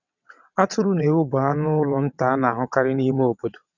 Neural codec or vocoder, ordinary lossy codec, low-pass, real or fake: vocoder, 22.05 kHz, 80 mel bands, WaveNeXt; none; 7.2 kHz; fake